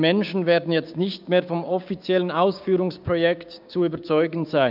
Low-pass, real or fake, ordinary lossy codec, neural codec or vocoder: 5.4 kHz; fake; none; codec, 16 kHz, 6 kbps, DAC